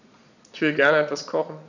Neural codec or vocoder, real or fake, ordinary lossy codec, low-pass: vocoder, 22.05 kHz, 80 mel bands, Vocos; fake; none; 7.2 kHz